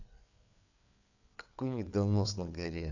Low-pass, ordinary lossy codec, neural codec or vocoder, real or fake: 7.2 kHz; none; codec, 16 kHz, 4 kbps, FreqCodec, larger model; fake